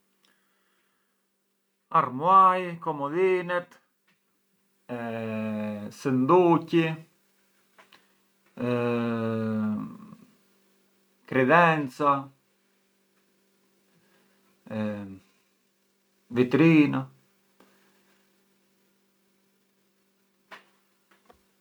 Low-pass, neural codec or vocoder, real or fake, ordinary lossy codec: none; none; real; none